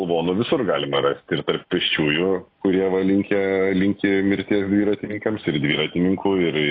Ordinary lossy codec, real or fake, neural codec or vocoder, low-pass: AAC, 32 kbps; real; none; 5.4 kHz